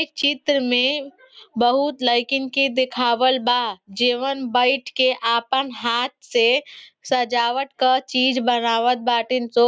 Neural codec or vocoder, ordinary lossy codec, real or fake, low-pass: none; none; real; none